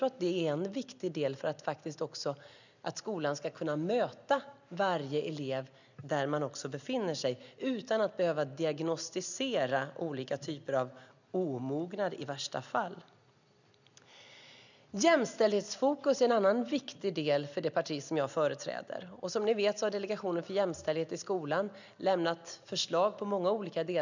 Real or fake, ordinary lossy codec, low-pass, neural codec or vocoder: real; none; 7.2 kHz; none